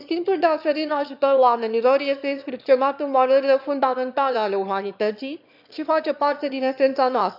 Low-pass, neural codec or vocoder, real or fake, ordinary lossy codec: 5.4 kHz; autoencoder, 22.05 kHz, a latent of 192 numbers a frame, VITS, trained on one speaker; fake; none